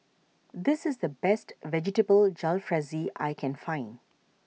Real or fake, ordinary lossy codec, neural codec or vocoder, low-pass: real; none; none; none